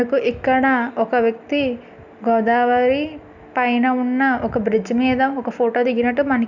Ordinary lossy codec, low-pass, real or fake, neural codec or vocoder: none; 7.2 kHz; real; none